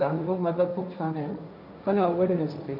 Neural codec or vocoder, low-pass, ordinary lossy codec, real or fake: codec, 16 kHz, 1.1 kbps, Voila-Tokenizer; 5.4 kHz; none; fake